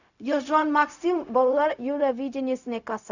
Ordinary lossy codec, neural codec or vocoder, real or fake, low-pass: none; codec, 16 kHz, 0.4 kbps, LongCat-Audio-Codec; fake; 7.2 kHz